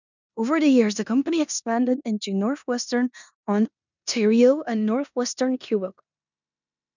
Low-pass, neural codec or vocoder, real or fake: 7.2 kHz; codec, 16 kHz in and 24 kHz out, 0.9 kbps, LongCat-Audio-Codec, four codebook decoder; fake